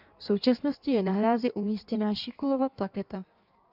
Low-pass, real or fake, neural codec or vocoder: 5.4 kHz; fake; codec, 16 kHz in and 24 kHz out, 1.1 kbps, FireRedTTS-2 codec